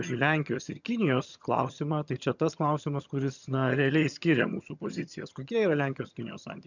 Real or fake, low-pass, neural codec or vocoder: fake; 7.2 kHz; vocoder, 22.05 kHz, 80 mel bands, HiFi-GAN